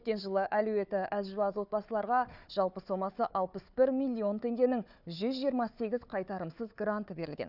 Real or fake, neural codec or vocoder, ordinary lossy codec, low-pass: fake; codec, 16 kHz, 4 kbps, FunCodec, trained on Chinese and English, 50 frames a second; none; 5.4 kHz